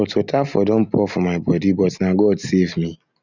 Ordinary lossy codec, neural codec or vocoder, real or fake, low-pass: none; none; real; 7.2 kHz